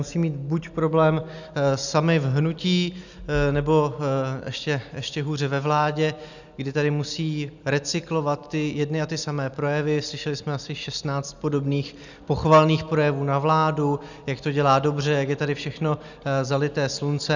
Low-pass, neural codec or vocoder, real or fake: 7.2 kHz; none; real